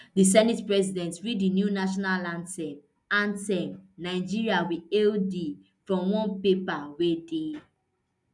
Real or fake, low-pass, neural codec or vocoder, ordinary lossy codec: real; 10.8 kHz; none; none